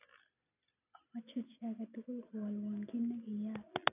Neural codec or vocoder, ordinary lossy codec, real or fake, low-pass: none; none; real; 3.6 kHz